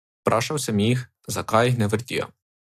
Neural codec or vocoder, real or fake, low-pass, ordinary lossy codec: none; real; 14.4 kHz; AAC, 96 kbps